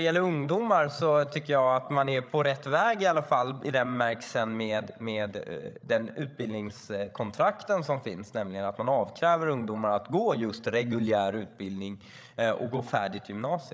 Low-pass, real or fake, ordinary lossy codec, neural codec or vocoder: none; fake; none; codec, 16 kHz, 8 kbps, FreqCodec, larger model